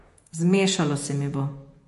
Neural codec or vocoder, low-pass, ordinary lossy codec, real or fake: vocoder, 48 kHz, 128 mel bands, Vocos; 14.4 kHz; MP3, 48 kbps; fake